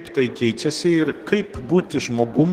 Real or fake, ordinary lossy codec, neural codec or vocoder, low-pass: fake; Opus, 16 kbps; codec, 32 kHz, 1.9 kbps, SNAC; 14.4 kHz